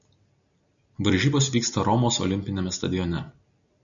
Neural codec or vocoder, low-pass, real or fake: none; 7.2 kHz; real